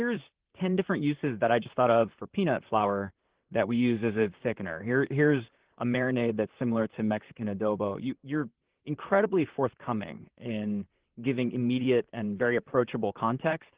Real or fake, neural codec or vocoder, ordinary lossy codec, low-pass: fake; vocoder, 44.1 kHz, 128 mel bands, Pupu-Vocoder; Opus, 16 kbps; 3.6 kHz